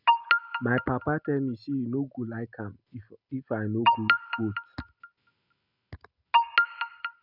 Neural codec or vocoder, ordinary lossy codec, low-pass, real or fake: none; none; 5.4 kHz; real